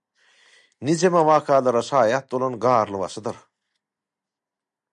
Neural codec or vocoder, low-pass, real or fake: none; 10.8 kHz; real